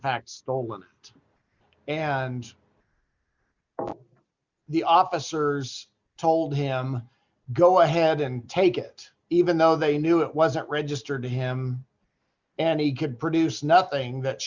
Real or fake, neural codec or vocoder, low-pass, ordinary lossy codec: real; none; 7.2 kHz; Opus, 64 kbps